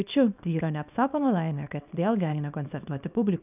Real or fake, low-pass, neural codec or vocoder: fake; 3.6 kHz; codec, 24 kHz, 0.9 kbps, WavTokenizer, small release